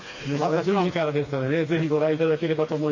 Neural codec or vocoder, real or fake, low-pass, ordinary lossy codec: codec, 16 kHz, 2 kbps, FreqCodec, smaller model; fake; 7.2 kHz; MP3, 32 kbps